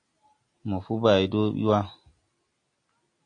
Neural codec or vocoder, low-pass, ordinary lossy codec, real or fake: none; 9.9 kHz; AAC, 48 kbps; real